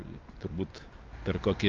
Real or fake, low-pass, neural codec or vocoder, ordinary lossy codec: real; 7.2 kHz; none; Opus, 24 kbps